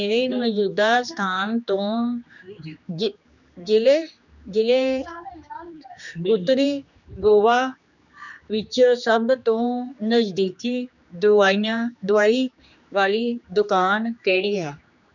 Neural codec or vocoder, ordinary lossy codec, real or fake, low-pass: codec, 16 kHz, 2 kbps, X-Codec, HuBERT features, trained on general audio; none; fake; 7.2 kHz